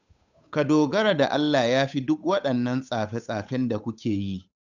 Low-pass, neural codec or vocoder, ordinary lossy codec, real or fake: 7.2 kHz; codec, 16 kHz, 8 kbps, FunCodec, trained on Chinese and English, 25 frames a second; none; fake